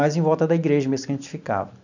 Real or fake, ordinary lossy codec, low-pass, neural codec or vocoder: real; none; 7.2 kHz; none